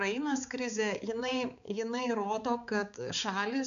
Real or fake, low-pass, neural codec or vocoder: fake; 7.2 kHz; codec, 16 kHz, 4 kbps, X-Codec, HuBERT features, trained on balanced general audio